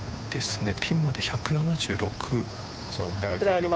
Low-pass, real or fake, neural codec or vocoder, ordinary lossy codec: none; fake; codec, 16 kHz, 2 kbps, FunCodec, trained on Chinese and English, 25 frames a second; none